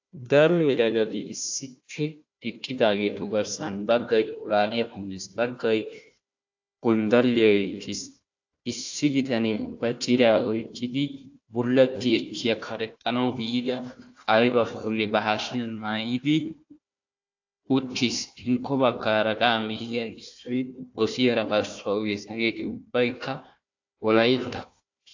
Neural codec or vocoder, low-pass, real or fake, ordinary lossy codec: codec, 16 kHz, 1 kbps, FunCodec, trained on Chinese and English, 50 frames a second; 7.2 kHz; fake; AAC, 48 kbps